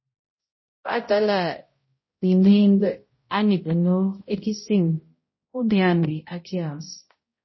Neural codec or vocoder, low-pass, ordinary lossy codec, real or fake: codec, 16 kHz, 0.5 kbps, X-Codec, HuBERT features, trained on balanced general audio; 7.2 kHz; MP3, 24 kbps; fake